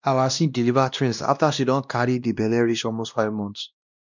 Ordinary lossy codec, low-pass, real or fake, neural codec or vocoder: none; 7.2 kHz; fake; codec, 16 kHz, 1 kbps, X-Codec, WavLM features, trained on Multilingual LibriSpeech